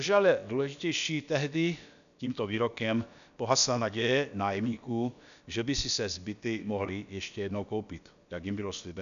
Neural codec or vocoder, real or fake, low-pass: codec, 16 kHz, about 1 kbps, DyCAST, with the encoder's durations; fake; 7.2 kHz